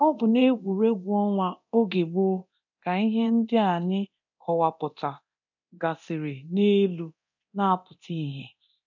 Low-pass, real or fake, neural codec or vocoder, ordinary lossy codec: 7.2 kHz; fake; codec, 24 kHz, 0.9 kbps, DualCodec; none